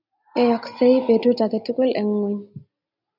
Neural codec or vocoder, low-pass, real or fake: none; 5.4 kHz; real